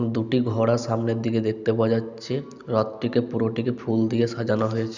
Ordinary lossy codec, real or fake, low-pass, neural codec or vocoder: none; real; 7.2 kHz; none